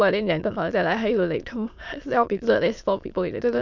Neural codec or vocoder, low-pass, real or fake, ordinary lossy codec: autoencoder, 22.05 kHz, a latent of 192 numbers a frame, VITS, trained on many speakers; 7.2 kHz; fake; none